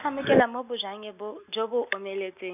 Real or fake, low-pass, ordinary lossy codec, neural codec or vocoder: real; 3.6 kHz; none; none